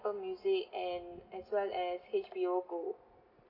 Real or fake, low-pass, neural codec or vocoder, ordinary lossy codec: real; 5.4 kHz; none; AAC, 48 kbps